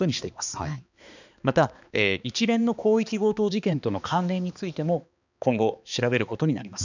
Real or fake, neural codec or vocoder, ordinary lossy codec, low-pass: fake; codec, 16 kHz, 2 kbps, X-Codec, HuBERT features, trained on balanced general audio; none; 7.2 kHz